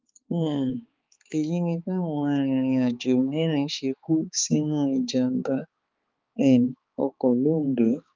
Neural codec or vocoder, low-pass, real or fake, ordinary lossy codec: codec, 16 kHz, 4 kbps, X-Codec, HuBERT features, trained on balanced general audio; 7.2 kHz; fake; Opus, 24 kbps